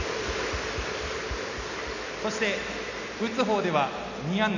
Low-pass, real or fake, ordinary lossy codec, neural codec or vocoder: 7.2 kHz; real; none; none